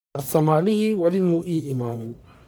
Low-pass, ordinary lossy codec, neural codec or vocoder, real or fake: none; none; codec, 44.1 kHz, 1.7 kbps, Pupu-Codec; fake